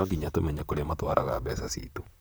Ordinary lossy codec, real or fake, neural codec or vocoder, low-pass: none; fake; vocoder, 44.1 kHz, 128 mel bands, Pupu-Vocoder; none